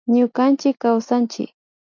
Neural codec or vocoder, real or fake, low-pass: none; real; 7.2 kHz